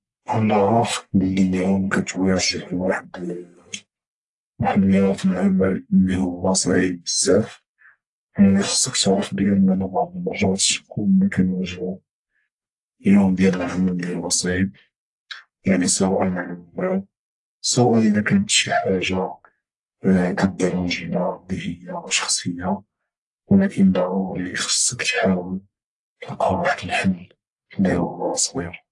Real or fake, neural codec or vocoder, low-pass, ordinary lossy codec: fake; codec, 44.1 kHz, 1.7 kbps, Pupu-Codec; 10.8 kHz; AAC, 64 kbps